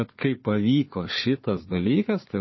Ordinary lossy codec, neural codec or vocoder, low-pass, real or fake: MP3, 24 kbps; codec, 16 kHz, 4 kbps, FunCodec, trained on Chinese and English, 50 frames a second; 7.2 kHz; fake